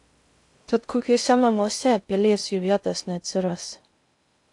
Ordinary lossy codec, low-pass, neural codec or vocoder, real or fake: AAC, 64 kbps; 10.8 kHz; codec, 16 kHz in and 24 kHz out, 0.6 kbps, FocalCodec, streaming, 2048 codes; fake